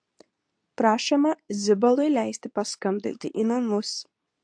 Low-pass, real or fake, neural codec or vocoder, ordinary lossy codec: 9.9 kHz; fake; codec, 24 kHz, 0.9 kbps, WavTokenizer, medium speech release version 2; AAC, 64 kbps